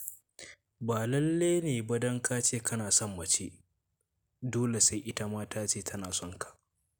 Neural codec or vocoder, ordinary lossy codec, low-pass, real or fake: none; none; none; real